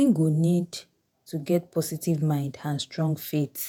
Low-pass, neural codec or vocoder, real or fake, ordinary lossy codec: none; vocoder, 48 kHz, 128 mel bands, Vocos; fake; none